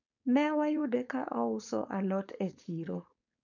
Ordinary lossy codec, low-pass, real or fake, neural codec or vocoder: none; 7.2 kHz; fake; codec, 16 kHz, 4.8 kbps, FACodec